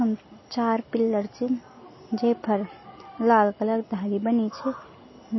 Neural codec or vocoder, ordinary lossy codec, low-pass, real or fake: none; MP3, 24 kbps; 7.2 kHz; real